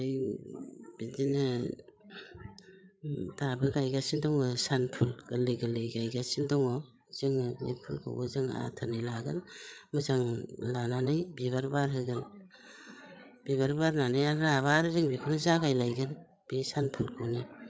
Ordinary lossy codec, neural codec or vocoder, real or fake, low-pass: none; codec, 16 kHz, 8 kbps, FreqCodec, larger model; fake; none